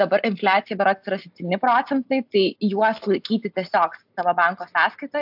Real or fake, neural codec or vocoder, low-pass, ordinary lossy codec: real; none; 5.4 kHz; AAC, 48 kbps